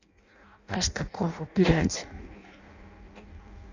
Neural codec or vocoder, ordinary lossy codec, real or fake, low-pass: codec, 16 kHz in and 24 kHz out, 0.6 kbps, FireRedTTS-2 codec; none; fake; 7.2 kHz